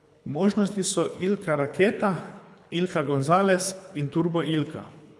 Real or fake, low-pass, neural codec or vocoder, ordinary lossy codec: fake; none; codec, 24 kHz, 3 kbps, HILCodec; none